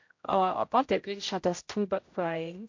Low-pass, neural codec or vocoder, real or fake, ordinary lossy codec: 7.2 kHz; codec, 16 kHz, 0.5 kbps, X-Codec, HuBERT features, trained on general audio; fake; MP3, 48 kbps